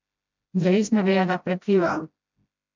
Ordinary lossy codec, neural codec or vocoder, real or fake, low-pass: MP3, 64 kbps; codec, 16 kHz, 0.5 kbps, FreqCodec, smaller model; fake; 7.2 kHz